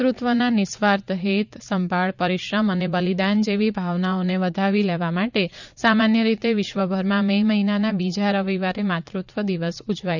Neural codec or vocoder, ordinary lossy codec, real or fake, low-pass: vocoder, 44.1 kHz, 80 mel bands, Vocos; none; fake; 7.2 kHz